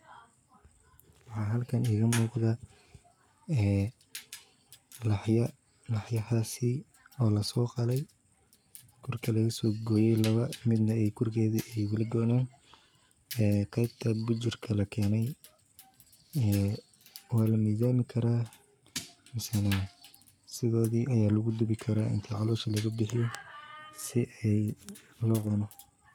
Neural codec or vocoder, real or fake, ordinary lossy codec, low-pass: codec, 44.1 kHz, 7.8 kbps, Pupu-Codec; fake; none; none